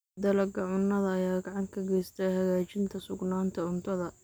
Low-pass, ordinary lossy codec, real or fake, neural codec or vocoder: none; none; real; none